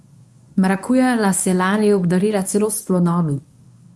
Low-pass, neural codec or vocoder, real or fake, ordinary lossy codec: none; codec, 24 kHz, 0.9 kbps, WavTokenizer, medium speech release version 1; fake; none